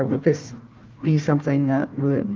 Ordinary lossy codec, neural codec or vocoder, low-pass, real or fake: Opus, 32 kbps; codec, 16 kHz, 1 kbps, FunCodec, trained on Chinese and English, 50 frames a second; 7.2 kHz; fake